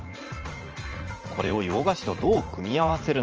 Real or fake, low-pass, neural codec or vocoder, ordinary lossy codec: fake; 7.2 kHz; vocoder, 44.1 kHz, 80 mel bands, Vocos; Opus, 24 kbps